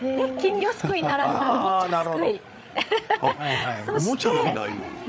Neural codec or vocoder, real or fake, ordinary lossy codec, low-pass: codec, 16 kHz, 8 kbps, FreqCodec, larger model; fake; none; none